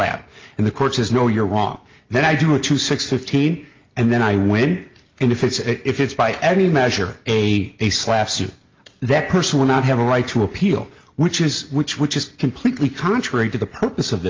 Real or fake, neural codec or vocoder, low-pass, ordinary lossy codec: real; none; 7.2 kHz; Opus, 32 kbps